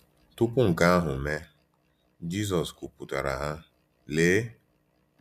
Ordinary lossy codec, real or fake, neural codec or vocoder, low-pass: none; real; none; 14.4 kHz